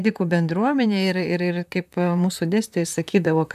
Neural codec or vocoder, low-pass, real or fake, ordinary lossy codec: vocoder, 44.1 kHz, 128 mel bands, Pupu-Vocoder; 14.4 kHz; fake; MP3, 96 kbps